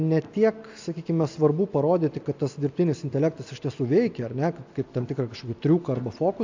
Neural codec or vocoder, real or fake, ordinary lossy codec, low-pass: none; real; AAC, 48 kbps; 7.2 kHz